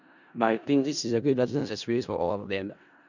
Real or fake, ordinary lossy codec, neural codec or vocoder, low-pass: fake; none; codec, 16 kHz in and 24 kHz out, 0.4 kbps, LongCat-Audio-Codec, four codebook decoder; 7.2 kHz